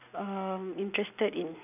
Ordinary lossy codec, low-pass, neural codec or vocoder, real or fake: none; 3.6 kHz; none; real